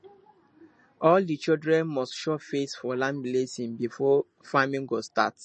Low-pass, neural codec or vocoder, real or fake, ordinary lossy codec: 9.9 kHz; none; real; MP3, 32 kbps